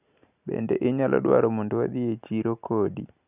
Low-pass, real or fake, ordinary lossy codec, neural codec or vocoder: 3.6 kHz; real; none; none